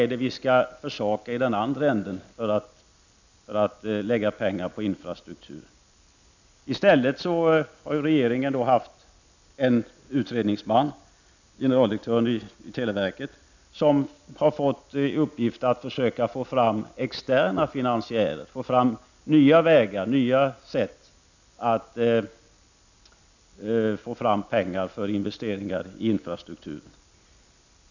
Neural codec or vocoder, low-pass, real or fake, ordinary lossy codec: none; 7.2 kHz; real; none